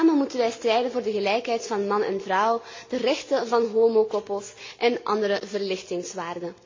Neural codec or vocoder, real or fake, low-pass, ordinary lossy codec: none; real; 7.2 kHz; MP3, 48 kbps